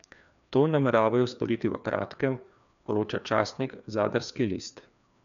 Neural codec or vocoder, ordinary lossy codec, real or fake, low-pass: codec, 16 kHz, 2 kbps, FreqCodec, larger model; none; fake; 7.2 kHz